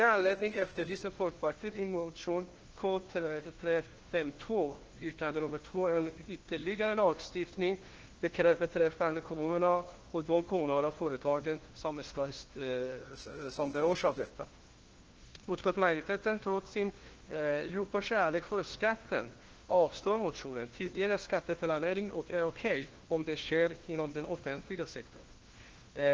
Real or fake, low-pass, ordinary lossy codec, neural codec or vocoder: fake; 7.2 kHz; Opus, 16 kbps; codec, 16 kHz, 1 kbps, FunCodec, trained on LibriTTS, 50 frames a second